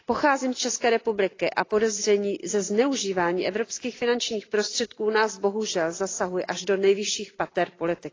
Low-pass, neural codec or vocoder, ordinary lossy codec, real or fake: 7.2 kHz; none; AAC, 32 kbps; real